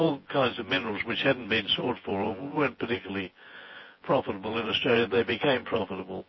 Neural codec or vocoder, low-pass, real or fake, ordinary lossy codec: vocoder, 24 kHz, 100 mel bands, Vocos; 7.2 kHz; fake; MP3, 24 kbps